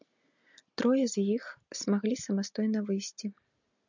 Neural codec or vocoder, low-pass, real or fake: none; 7.2 kHz; real